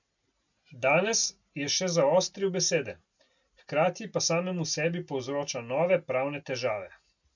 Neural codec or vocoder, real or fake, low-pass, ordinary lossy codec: none; real; 7.2 kHz; none